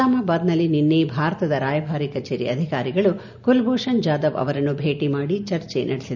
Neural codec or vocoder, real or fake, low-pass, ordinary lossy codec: none; real; 7.2 kHz; none